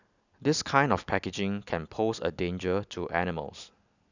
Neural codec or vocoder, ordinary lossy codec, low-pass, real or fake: none; none; 7.2 kHz; real